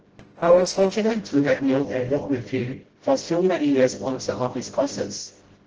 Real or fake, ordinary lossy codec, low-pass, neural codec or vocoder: fake; Opus, 16 kbps; 7.2 kHz; codec, 16 kHz, 0.5 kbps, FreqCodec, smaller model